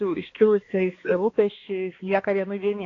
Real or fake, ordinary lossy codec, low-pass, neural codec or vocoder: fake; AAC, 32 kbps; 7.2 kHz; codec, 16 kHz, 2 kbps, X-Codec, HuBERT features, trained on balanced general audio